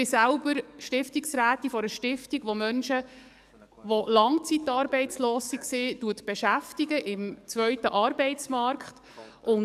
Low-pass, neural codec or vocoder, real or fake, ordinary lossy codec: 14.4 kHz; codec, 44.1 kHz, 7.8 kbps, DAC; fake; none